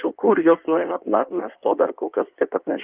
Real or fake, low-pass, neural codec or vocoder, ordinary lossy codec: fake; 3.6 kHz; codec, 16 kHz in and 24 kHz out, 1.1 kbps, FireRedTTS-2 codec; Opus, 24 kbps